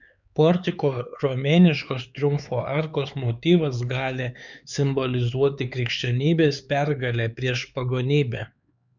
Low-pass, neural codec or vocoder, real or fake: 7.2 kHz; codec, 16 kHz, 4 kbps, X-Codec, HuBERT features, trained on LibriSpeech; fake